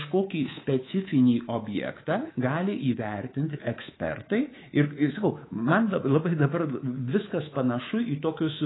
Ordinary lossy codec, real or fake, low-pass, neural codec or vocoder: AAC, 16 kbps; fake; 7.2 kHz; codec, 24 kHz, 3.1 kbps, DualCodec